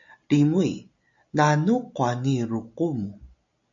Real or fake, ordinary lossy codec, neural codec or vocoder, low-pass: real; AAC, 64 kbps; none; 7.2 kHz